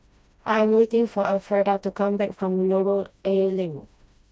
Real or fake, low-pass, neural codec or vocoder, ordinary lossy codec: fake; none; codec, 16 kHz, 1 kbps, FreqCodec, smaller model; none